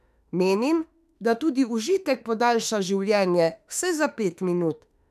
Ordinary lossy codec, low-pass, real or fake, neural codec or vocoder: none; 14.4 kHz; fake; autoencoder, 48 kHz, 32 numbers a frame, DAC-VAE, trained on Japanese speech